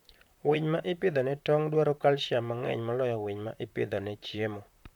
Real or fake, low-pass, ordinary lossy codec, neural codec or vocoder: fake; 19.8 kHz; none; vocoder, 44.1 kHz, 128 mel bands, Pupu-Vocoder